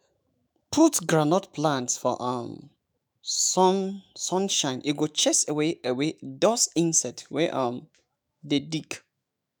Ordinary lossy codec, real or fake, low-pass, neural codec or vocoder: none; fake; none; autoencoder, 48 kHz, 128 numbers a frame, DAC-VAE, trained on Japanese speech